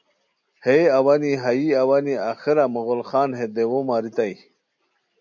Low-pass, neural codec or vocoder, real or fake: 7.2 kHz; none; real